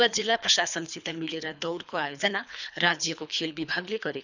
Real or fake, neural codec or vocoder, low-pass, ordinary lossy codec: fake; codec, 24 kHz, 3 kbps, HILCodec; 7.2 kHz; none